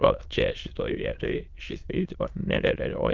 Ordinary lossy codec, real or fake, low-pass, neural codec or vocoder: Opus, 32 kbps; fake; 7.2 kHz; autoencoder, 22.05 kHz, a latent of 192 numbers a frame, VITS, trained on many speakers